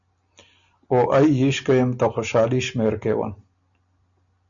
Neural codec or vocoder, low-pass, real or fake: none; 7.2 kHz; real